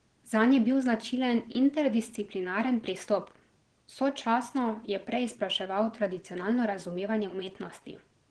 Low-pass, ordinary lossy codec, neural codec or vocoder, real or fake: 9.9 kHz; Opus, 16 kbps; vocoder, 22.05 kHz, 80 mel bands, Vocos; fake